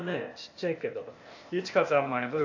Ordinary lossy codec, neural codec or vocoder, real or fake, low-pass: AAC, 48 kbps; codec, 16 kHz, 0.8 kbps, ZipCodec; fake; 7.2 kHz